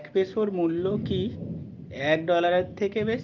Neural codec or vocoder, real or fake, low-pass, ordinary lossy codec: none; real; 7.2 kHz; Opus, 32 kbps